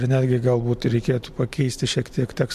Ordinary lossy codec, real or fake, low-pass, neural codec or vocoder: MP3, 64 kbps; real; 14.4 kHz; none